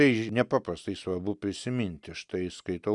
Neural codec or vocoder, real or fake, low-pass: none; real; 10.8 kHz